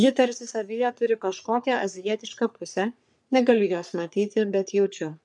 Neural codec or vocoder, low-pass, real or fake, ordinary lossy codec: codec, 44.1 kHz, 3.4 kbps, Pupu-Codec; 10.8 kHz; fake; MP3, 96 kbps